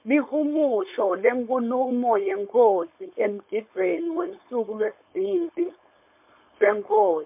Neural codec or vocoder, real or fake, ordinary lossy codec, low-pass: codec, 16 kHz, 4.8 kbps, FACodec; fake; MP3, 32 kbps; 3.6 kHz